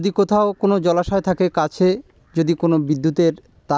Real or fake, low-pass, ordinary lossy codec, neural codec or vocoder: real; none; none; none